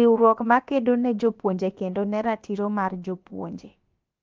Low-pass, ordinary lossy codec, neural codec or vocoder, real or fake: 7.2 kHz; Opus, 24 kbps; codec, 16 kHz, about 1 kbps, DyCAST, with the encoder's durations; fake